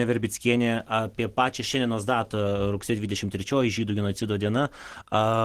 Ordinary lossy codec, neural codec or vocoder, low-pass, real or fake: Opus, 16 kbps; none; 14.4 kHz; real